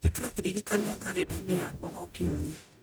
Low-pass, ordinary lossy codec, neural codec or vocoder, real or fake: none; none; codec, 44.1 kHz, 0.9 kbps, DAC; fake